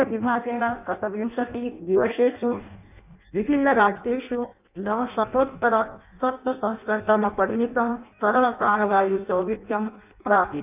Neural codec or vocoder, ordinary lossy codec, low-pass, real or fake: codec, 16 kHz in and 24 kHz out, 0.6 kbps, FireRedTTS-2 codec; none; 3.6 kHz; fake